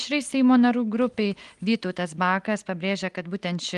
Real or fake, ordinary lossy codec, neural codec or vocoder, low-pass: real; Opus, 24 kbps; none; 10.8 kHz